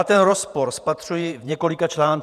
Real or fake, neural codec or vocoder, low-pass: fake; vocoder, 48 kHz, 128 mel bands, Vocos; 14.4 kHz